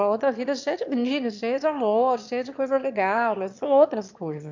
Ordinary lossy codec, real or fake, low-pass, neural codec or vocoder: MP3, 64 kbps; fake; 7.2 kHz; autoencoder, 22.05 kHz, a latent of 192 numbers a frame, VITS, trained on one speaker